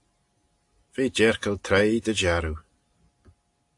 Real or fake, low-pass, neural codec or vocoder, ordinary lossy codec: real; 10.8 kHz; none; AAC, 64 kbps